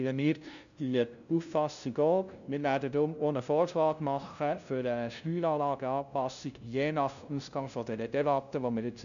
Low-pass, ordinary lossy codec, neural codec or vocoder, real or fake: 7.2 kHz; none; codec, 16 kHz, 0.5 kbps, FunCodec, trained on LibriTTS, 25 frames a second; fake